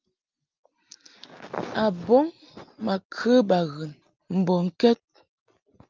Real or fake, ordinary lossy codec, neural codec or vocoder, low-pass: real; Opus, 24 kbps; none; 7.2 kHz